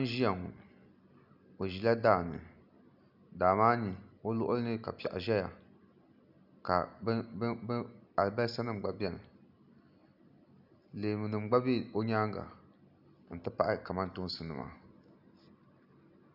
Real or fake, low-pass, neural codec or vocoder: real; 5.4 kHz; none